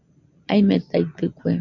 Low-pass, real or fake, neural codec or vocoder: 7.2 kHz; real; none